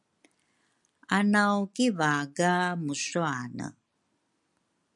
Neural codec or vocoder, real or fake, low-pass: none; real; 10.8 kHz